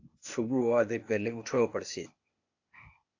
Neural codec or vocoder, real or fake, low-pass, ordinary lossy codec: codec, 16 kHz, 0.8 kbps, ZipCodec; fake; 7.2 kHz; AAC, 48 kbps